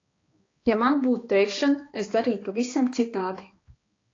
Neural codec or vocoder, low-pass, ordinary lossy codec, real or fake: codec, 16 kHz, 2 kbps, X-Codec, HuBERT features, trained on balanced general audio; 7.2 kHz; AAC, 32 kbps; fake